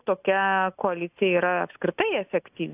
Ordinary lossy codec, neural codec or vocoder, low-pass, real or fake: AAC, 32 kbps; none; 3.6 kHz; real